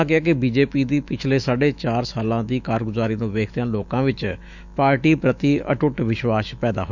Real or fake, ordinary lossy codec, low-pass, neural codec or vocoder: fake; none; 7.2 kHz; autoencoder, 48 kHz, 128 numbers a frame, DAC-VAE, trained on Japanese speech